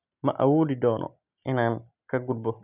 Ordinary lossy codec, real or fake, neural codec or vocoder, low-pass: none; real; none; 3.6 kHz